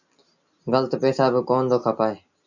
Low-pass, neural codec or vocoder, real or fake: 7.2 kHz; none; real